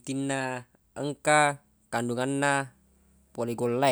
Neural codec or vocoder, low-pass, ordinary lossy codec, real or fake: none; none; none; real